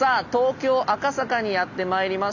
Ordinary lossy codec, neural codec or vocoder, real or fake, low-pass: none; none; real; 7.2 kHz